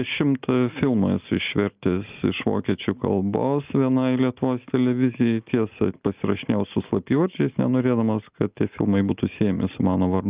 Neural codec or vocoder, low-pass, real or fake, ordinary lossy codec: none; 3.6 kHz; real; Opus, 32 kbps